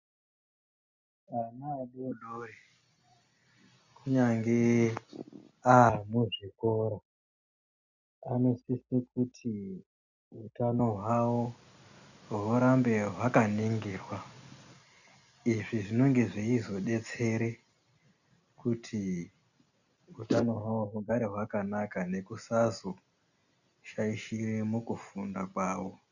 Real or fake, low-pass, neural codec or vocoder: real; 7.2 kHz; none